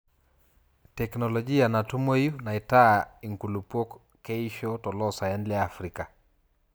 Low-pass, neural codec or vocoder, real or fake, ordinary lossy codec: none; none; real; none